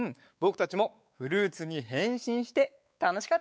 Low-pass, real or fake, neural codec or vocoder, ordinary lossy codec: none; fake; codec, 16 kHz, 4 kbps, X-Codec, WavLM features, trained on Multilingual LibriSpeech; none